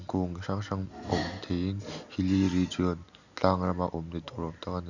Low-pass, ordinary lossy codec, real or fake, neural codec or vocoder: 7.2 kHz; none; real; none